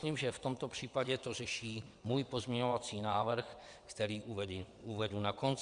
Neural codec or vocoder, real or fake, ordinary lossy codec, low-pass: vocoder, 22.05 kHz, 80 mel bands, WaveNeXt; fake; Opus, 64 kbps; 9.9 kHz